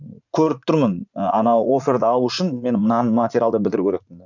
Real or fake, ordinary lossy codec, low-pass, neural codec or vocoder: fake; none; 7.2 kHz; vocoder, 44.1 kHz, 80 mel bands, Vocos